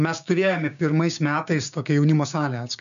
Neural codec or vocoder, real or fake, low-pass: codec, 16 kHz, 6 kbps, DAC; fake; 7.2 kHz